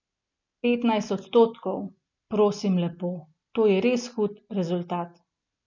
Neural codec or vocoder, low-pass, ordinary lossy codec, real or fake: none; 7.2 kHz; none; real